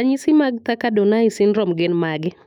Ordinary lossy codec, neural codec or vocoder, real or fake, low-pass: none; autoencoder, 48 kHz, 128 numbers a frame, DAC-VAE, trained on Japanese speech; fake; 19.8 kHz